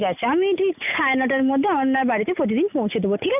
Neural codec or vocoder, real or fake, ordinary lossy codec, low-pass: none; real; none; 3.6 kHz